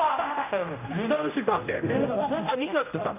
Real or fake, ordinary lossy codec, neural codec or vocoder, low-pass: fake; none; codec, 16 kHz, 0.5 kbps, X-Codec, HuBERT features, trained on general audio; 3.6 kHz